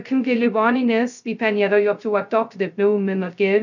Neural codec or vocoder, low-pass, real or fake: codec, 16 kHz, 0.2 kbps, FocalCodec; 7.2 kHz; fake